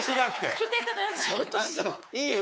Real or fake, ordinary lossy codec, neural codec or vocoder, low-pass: fake; none; codec, 16 kHz, 4 kbps, X-Codec, WavLM features, trained on Multilingual LibriSpeech; none